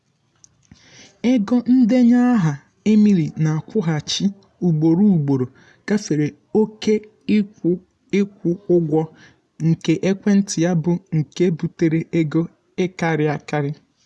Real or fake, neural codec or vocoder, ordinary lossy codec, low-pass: real; none; none; none